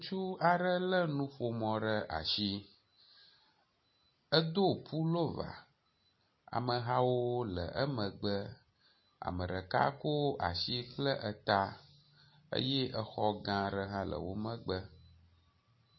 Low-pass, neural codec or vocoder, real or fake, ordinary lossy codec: 7.2 kHz; none; real; MP3, 24 kbps